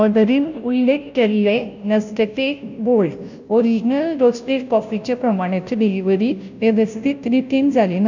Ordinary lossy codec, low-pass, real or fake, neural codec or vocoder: none; 7.2 kHz; fake; codec, 16 kHz, 0.5 kbps, FunCodec, trained on Chinese and English, 25 frames a second